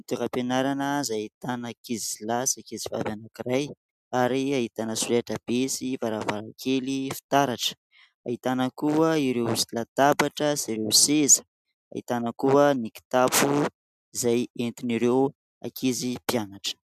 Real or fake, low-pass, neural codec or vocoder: real; 14.4 kHz; none